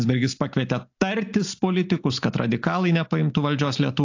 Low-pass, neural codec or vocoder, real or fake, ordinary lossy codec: 7.2 kHz; none; real; AAC, 48 kbps